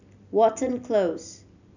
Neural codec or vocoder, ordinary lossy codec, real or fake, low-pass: none; none; real; 7.2 kHz